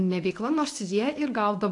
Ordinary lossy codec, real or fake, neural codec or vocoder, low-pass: AAC, 48 kbps; fake; codec, 24 kHz, 0.9 kbps, WavTokenizer, medium speech release version 1; 10.8 kHz